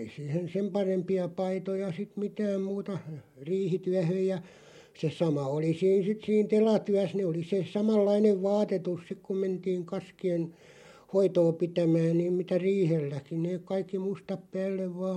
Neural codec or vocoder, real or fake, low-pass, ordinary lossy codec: none; real; 19.8 kHz; MP3, 64 kbps